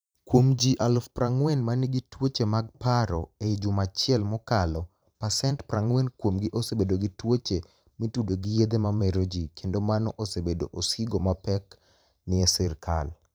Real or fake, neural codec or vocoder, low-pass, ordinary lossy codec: fake; vocoder, 44.1 kHz, 128 mel bands every 512 samples, BigVGAN v2; none; none